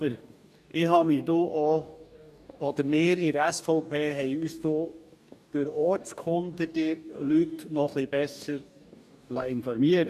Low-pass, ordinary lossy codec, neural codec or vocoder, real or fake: 14.4 kHz; none; codec, 44.1 kHz, 2.6 kbps, DAC; fake